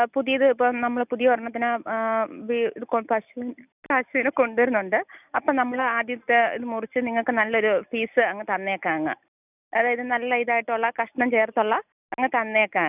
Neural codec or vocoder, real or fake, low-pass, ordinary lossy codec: none; real; 3.6 kHz; none